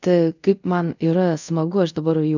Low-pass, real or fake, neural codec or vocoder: 7.2 kHz; fake; codec, 24 kHz, 0.5 kbps, DualCodec